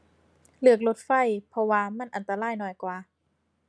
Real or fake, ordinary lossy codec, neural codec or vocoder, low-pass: real; none; none; none